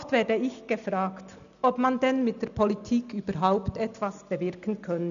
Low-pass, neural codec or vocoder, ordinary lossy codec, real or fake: 7.2 kHz; none; none; real